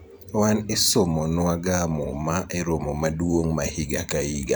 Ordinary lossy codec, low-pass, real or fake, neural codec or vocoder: none; none; fake; vocoder, 44.1 kHz, 128 mel bands every 512 samples, BigVGAN v2